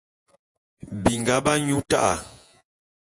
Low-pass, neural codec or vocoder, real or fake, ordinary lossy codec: 10.8 kHz; vocoder, 48 kHz, 128 mel bands, Vocos; fake; AAC, 64 kbps